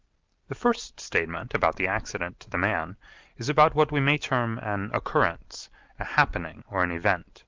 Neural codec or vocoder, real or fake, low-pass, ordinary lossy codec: none; real; 7.2 kHz; Opus, 32 kbps